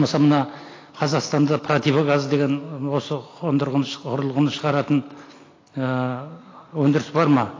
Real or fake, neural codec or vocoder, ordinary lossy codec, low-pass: real; none; AAC, 32 kbps; 7.2 kHz